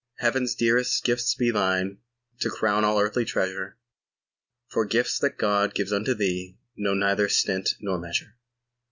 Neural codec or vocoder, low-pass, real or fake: none; 7.2 kHz; real